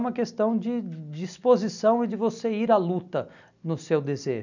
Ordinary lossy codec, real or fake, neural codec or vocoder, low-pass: none; real; none; 7.2 kHz